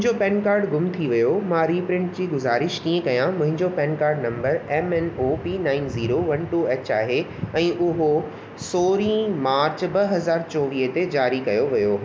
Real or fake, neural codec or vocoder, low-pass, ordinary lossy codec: real; none; none; none